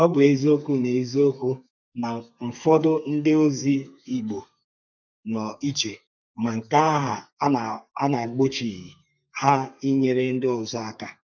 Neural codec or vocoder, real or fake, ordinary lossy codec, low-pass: codec, 32 kHz, 1.9 kbps, SNAC; fake; none; 7.2 kHz